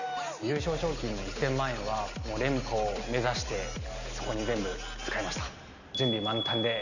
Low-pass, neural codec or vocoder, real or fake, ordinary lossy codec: 7.2 kHz; none; real; none